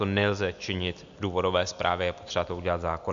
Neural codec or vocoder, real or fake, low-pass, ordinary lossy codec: none; real; 7.2 kHz; MP3, 64 kbps